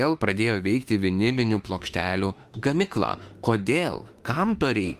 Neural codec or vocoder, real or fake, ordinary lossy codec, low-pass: autoencoder, 48 kHz, 32 numbers a frame, DAC-VAE, trained on Japanese speech; fake; Opus, 32 kbps; 14.4 kHz